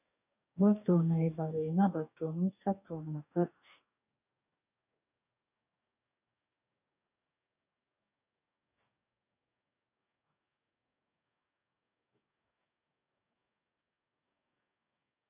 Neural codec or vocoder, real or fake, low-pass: codec, 44.1 kHz, 2.6 kbps, DAC; fake; 3.6 kHz